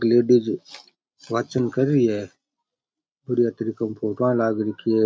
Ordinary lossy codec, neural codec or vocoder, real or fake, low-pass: none; none; real; none